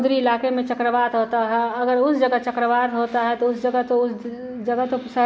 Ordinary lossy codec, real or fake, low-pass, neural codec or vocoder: none; real; none; none